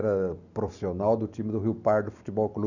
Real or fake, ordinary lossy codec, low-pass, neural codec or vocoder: real; none; 7.2 kHz; none